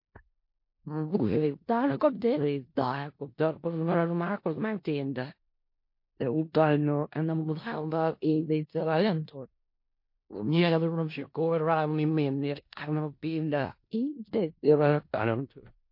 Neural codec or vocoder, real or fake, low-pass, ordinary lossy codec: codec, 16 kHz in and 24 kHz out, 0.4 kbps, LongCat-Audio-Codec, four codebook decoder; fake; 5.4 kHz; MP3, 32 kbps